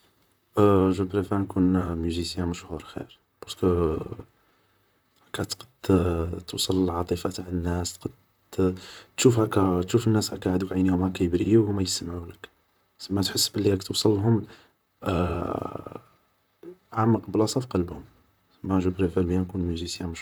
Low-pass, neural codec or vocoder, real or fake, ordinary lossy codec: none; vocoder, 44.1 kHz, 128 mel bands, Pupu-Vocoder; fake; none